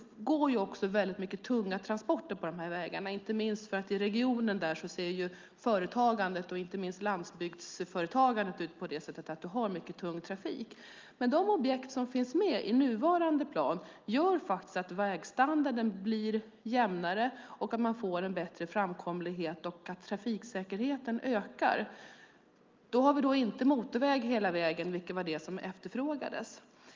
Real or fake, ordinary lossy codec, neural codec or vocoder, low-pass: real; Opus, 24 kbps; none; 7.2 kHz